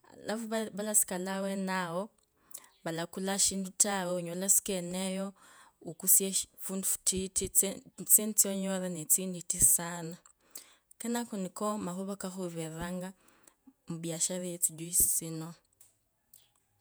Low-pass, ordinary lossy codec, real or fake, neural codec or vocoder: none; none; fake; vocoder, 48 kHz, 128 mel bands, Vocos